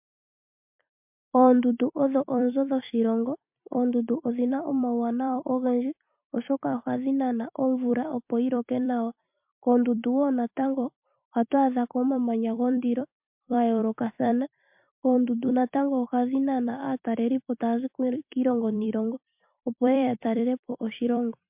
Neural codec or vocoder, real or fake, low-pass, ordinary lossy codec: vocoder, 44.1 kHz, 128 mel bands every 256 samples, BigVGAN v2; fake; 3.6 kHz; MP3, 32 kbps